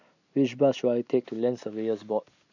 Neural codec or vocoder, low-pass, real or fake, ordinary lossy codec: none; 7.2 kHz; real; none